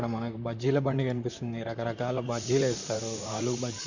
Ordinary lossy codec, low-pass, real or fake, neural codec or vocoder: none; 7.2 kHz; fake; vocoder, 44.1 kHz, 128 mel bands, Pupu-Vocoder